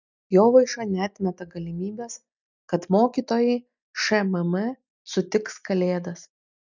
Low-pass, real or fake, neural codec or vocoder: 7.2 kHz; real; none